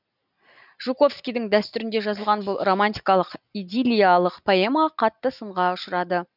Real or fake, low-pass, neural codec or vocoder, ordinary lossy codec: real; 5.4 kHz; none; none